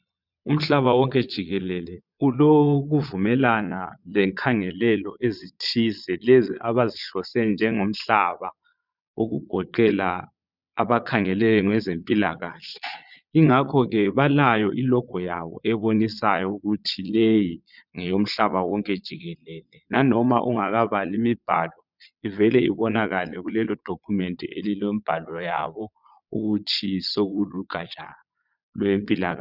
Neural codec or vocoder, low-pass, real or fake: vocoder, 22.05 kHz, 80 mel bands, Vocos; 5.4 kHz; fake